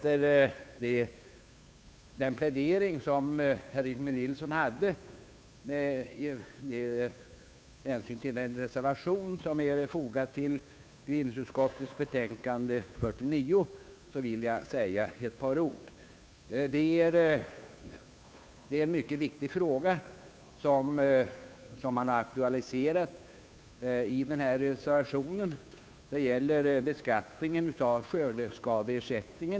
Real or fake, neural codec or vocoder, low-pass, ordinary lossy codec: fake; codec, 16 kHz, 2 kbps, FunCodec, trained on Chinese and English, 25 frames a second; none; none